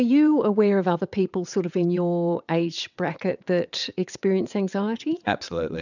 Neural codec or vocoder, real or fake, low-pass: vocoder, 22.05 kHz, 80 mel bands, WaveNeXt; fake; 7.2 kHz